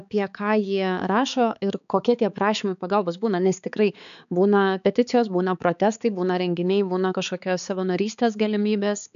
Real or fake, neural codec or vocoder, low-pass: fake; codec, 16 kHz, 4 kbps, X-Codec, HuBERT features, trained on balanced general audio; 7.2 kHz